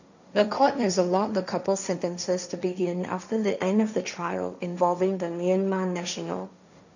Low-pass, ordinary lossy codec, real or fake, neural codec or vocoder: 7.2 kHz; none; fake; codec, 16 kHz, 1.1 kbps, Voila-Tokenizer